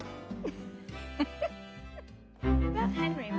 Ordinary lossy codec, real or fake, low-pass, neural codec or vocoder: none; real; none; none